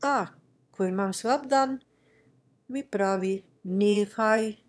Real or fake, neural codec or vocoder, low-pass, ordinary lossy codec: fake; autoencoder, 22.05 kHz, a latent of 192 numbers a frame, VITS, trained on one speaker; none; none